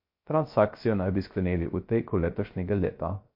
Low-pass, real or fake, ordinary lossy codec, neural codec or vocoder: 5.4 kHz; fake; MP3, 32 kbps; codec, 16 kHz, 0.3 kbps, FocalCodec